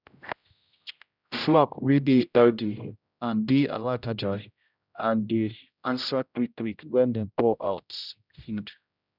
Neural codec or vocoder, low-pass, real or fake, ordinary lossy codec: codec, 16 kHz, 0.5 kbps, X-Codec, HuBERT features, trained on general audio; 5.4 kHz; fake; none